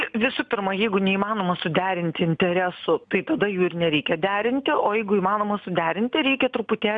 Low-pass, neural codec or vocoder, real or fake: 9.9 kHz; none; real